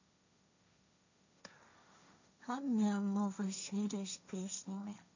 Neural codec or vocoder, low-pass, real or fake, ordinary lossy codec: codec, 16 kHz, 1.1 kbps, Voila-Tokenizer; 7.2 kHz; fake; none